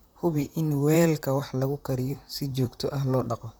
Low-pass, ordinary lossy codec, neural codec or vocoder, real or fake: none; none; vocoder, 44.1 kHz, 128 mel bands, Pupu-Vocoder; fake